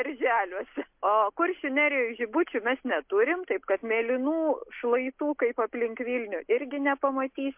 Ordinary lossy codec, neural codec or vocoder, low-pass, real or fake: MP3, 32 kbps; none; 3.6 kHz; real